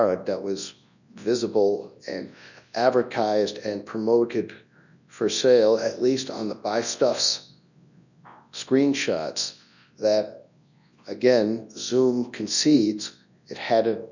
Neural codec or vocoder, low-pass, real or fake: codec, 24 kHz, 0.9 kbps, WavTokenizer, large speech release; 7.2 kHz; fake